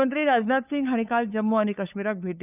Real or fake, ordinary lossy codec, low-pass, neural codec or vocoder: fake; none; 3.6 kHz; codec, 16 kHz, 8 kbps, FunCodec, trained on LibriTTS, 25 frames a second